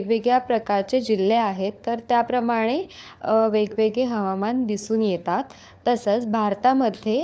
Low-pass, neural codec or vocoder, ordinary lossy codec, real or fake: none; codec, 16 kHz, 4 kbps, FunCodec, trained on LibriTTS, 50 frames a second; none; fake